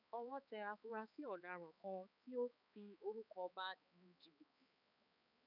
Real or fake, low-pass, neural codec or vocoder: fake; 5.4 kHz; codec, 16 kHz, 4 kbps, X-Codec, HuBERT features, trained on balanced general audio